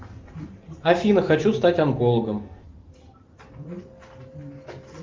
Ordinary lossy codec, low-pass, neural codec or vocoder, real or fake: Opus, 32 kbps; 7.2 kHz; none; real